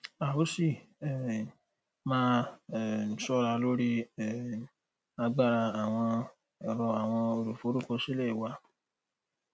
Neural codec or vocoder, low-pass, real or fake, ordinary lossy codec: none; none; real; none